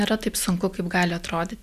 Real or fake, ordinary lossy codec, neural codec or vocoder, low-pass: real; Opus, 64 kbps; none; 14.4 kHz